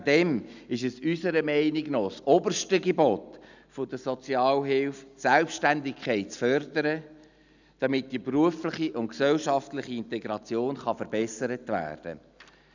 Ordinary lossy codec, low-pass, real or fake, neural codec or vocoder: none; 7.2 kHz; real; none